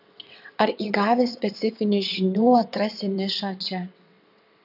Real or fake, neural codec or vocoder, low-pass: fake; vocoder, 22.05 kHz, 80 mel bands, WaveNeXt; 5.4 kHz